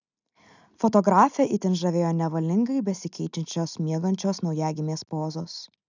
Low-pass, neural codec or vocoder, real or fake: 7.2 kHz; none; real